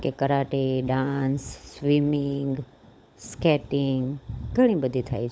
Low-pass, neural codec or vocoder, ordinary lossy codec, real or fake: none; codec, 16 kHz, 16 kbps, FunCodec, trained on LibriTTS, 50 frames a second; none; fake